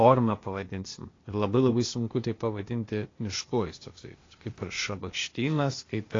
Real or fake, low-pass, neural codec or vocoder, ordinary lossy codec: fake; 7.2 kHz; codec, 16 kHz, 0.8 kbps, ZipCodec; AAC, 32 kbps